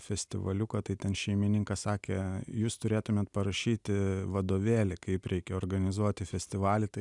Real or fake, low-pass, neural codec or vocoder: real; 10.8 kHz; none